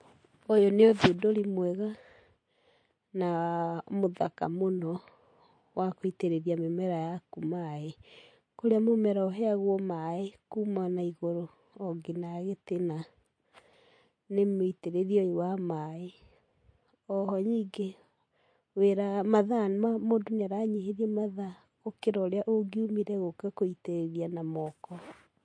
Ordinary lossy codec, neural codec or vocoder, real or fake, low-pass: MP3, 48 kbps; none; real; 9.9 kHz